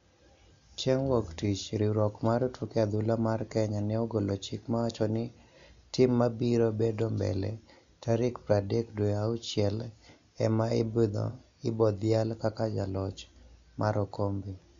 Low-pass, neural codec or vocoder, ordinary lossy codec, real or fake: 7.2 kHz; none; MP3, 64 kbps; real